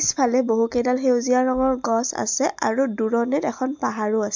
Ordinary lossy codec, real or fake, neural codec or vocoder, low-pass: MP3, 64 kbps; real; none; 7.2 kHz